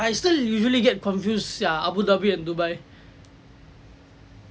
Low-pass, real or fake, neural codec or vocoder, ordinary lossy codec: none; real; none; none